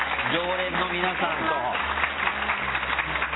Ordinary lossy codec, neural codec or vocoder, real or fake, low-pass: AAC, 16 kbps; none; real; 7.2 kHz